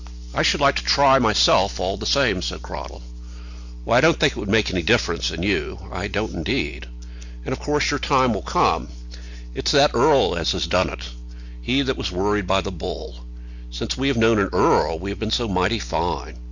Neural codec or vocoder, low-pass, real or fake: none; 7.2 kHz; real